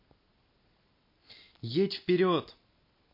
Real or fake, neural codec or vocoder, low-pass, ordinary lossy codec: real; none; 5.4 kHz; MP3, 32 kbps